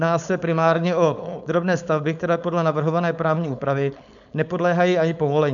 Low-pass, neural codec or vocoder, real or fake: 7.2 kHz; codec, 16 kHz, 4.8 kbps, FACodec; fake